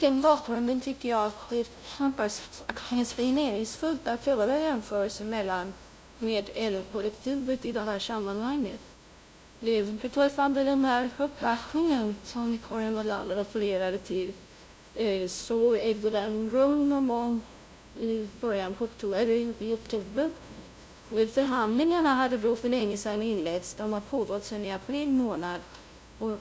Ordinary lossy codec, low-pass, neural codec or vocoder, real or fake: none; none; codec, 16 kHz, 0.5 kbps, FunCodec, trained on LibriTTS, 25 frames a second; fake